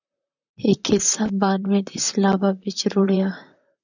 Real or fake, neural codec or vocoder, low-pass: fake; vocoder, 44.1 kHz, 128 mel bands, Pupu-Vocoder; 7.2 kHz